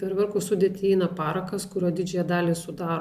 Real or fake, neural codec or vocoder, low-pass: real; none; 14.4 kHz